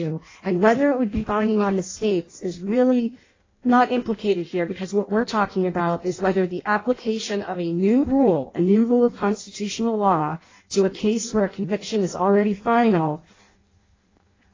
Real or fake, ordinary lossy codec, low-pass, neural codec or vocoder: fake; AAC, 32 kbps; 7.2 kHz; codec, 16 kHz in and 24 kHz out, 0.6 kbps, FireRedTTS-2 codec